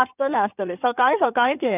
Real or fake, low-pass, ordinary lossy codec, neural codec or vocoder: fake; 3.6 kHz; none; codec, 16 kHz in and 24 kHz out, 2.2 kbps, FireRedTTS-2 codec